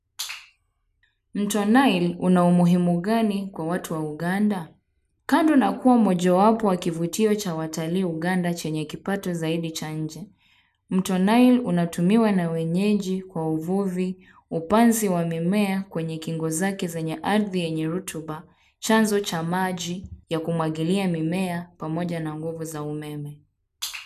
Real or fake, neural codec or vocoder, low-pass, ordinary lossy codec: real; none; 14.4 kHz; none